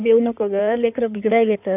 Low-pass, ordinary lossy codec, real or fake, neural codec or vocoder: 3.6 kHz; none; fake; codec, 16 kHz in and 24 kHz out, 2.2 kbps, FireRedTTS-2 codec